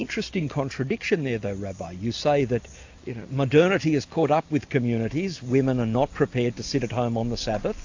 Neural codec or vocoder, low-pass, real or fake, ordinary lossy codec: none; 7.2 kHz; real; AAC, 48 kbps